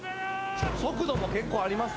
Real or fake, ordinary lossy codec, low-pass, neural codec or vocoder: real; none; none; none